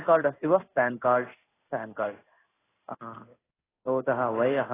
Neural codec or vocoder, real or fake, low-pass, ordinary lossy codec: codec, 16 kHz in and 24 kHz out, 1 kbps, XY-Tokenizer; fake; 3.6 kHz; AAC, 16 kbps